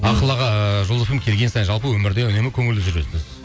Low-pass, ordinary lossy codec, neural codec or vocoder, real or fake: none; none; none; real